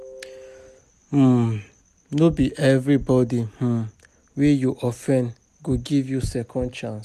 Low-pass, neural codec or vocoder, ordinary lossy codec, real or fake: 14.4 kHz; none; none; real